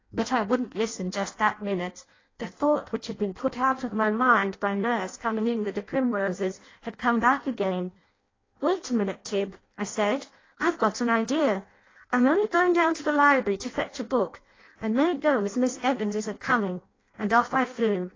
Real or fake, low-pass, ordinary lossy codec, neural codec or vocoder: fake; 7.2 kHz; AAC, 32 kbps; codec, 16 kHz in and 24 kHz out, 0.6 kbps, FireRedTTS-2 codec